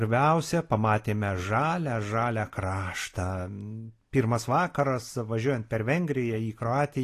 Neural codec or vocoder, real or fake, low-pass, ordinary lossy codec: none; real; 14.4 kHz; AAC, 48 kbps